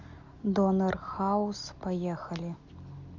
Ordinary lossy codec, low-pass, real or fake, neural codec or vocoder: AAC, 48 kbps; 7.2 kHz; real; none